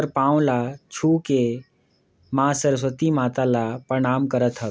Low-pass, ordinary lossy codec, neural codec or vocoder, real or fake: none; none; none; real